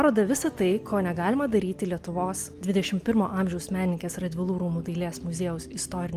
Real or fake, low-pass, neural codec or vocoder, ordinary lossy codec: real; 14.4 kHz; none; Opus, 32 kbps